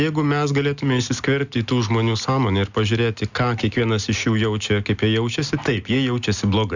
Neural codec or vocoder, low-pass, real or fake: none; 7.2 kHz; real